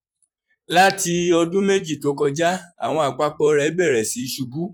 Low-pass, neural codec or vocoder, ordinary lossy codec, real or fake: 19.8 kHz; vocoder, 44.1 kHz, 128 mel bands, Pupu-Vocoder; none; fake